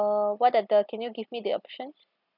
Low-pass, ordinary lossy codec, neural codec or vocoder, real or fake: 5.4 kHz; none; none; real